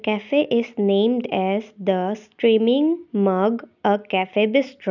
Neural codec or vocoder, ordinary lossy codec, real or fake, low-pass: none; none; real; 7.2 kHz